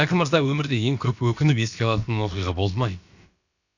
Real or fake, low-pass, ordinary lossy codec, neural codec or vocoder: fake; 7.2 kHz; none; codec, 16 kHz, about 1 kbps, DyCAST, with the encoder's durations